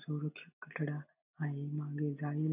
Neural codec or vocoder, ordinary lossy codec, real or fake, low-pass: none; none; real; 3.6 kHz